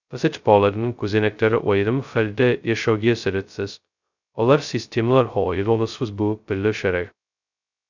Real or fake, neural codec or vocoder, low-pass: fake; codec, 16 kHz, 0.2 kbps, FocalCodec; 7.2 kHz